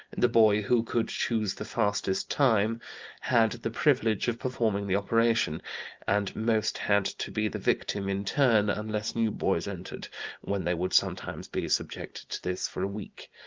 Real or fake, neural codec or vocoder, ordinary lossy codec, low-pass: real; none; Opus, 24 kbps; 7.2 kHz